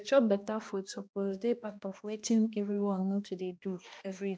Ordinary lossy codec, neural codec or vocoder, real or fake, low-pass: none; codec, 16 kHz, 1 kbps, X-Codec, HuBERT features, trained on balanced general audio; fake; none